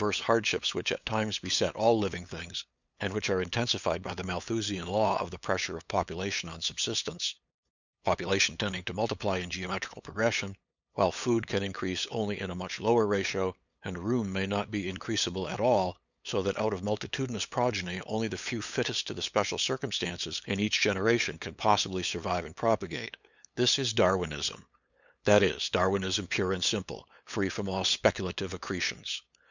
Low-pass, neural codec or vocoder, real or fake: 7.2 kHz; codec, 16 kHz, 8 kbps, FunCodec, trained on LibriTTS, 25 frames a second; fake